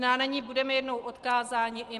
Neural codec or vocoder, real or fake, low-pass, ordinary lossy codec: none; real; 10.8 kHz; Opus, 24 kbps